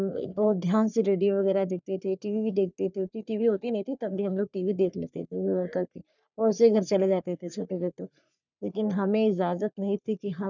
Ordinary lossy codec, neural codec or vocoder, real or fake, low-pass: none; codec, 44.1 kHz, 3.4 kbps, Pupu-Codec; fake; 7.2 kHz